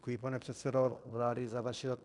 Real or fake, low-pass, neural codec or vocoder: fake; 10.8 kHz; codec, 16 kHz in and 24 kHz out, 0.9 kbps, LongCat-Audio-Codec, fine tuned four codebook decoder